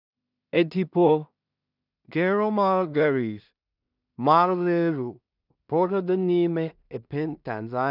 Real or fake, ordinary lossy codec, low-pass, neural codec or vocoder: fake; none; 5.4 kHz; codec, 16 kHz in and 24 kHz out, 0.4 kbps, LongCat-Audio-Codec, two codebook decoder